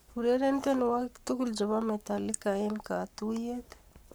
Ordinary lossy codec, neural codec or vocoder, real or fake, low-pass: none; codec, 44.1 kHz, 7.8 kbps, Pupu-Codec; fake; none